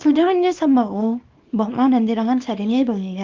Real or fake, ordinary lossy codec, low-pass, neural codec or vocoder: fake; Opus, 24 kbps; 7.2 kHz; codec, 24 kHz, 0.9 kbps, WavTokenizer, small release